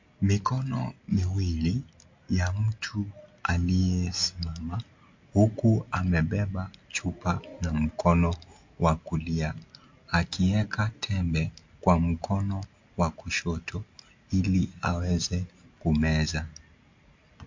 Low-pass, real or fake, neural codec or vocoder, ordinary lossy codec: 7.2 kHz; real; none; MP3, 48 kbps